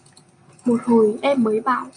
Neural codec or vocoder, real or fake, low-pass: none; real; 9.9 kHz